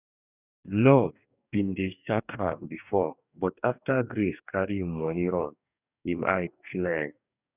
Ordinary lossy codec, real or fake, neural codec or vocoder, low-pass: none; fake; codec, 44.1 kHz, 2.6 kbps, DAC; 3.6 kHz